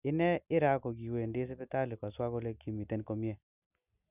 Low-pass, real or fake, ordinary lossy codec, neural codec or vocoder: 3.6 kHz; real; none; none